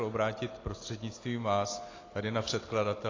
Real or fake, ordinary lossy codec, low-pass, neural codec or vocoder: real; AAC, 32 kbps; 7.2 kHz; none